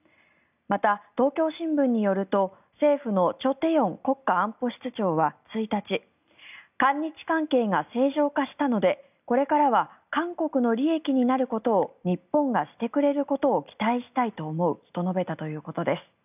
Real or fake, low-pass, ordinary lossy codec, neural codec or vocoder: real; 3.6 kHz; none; none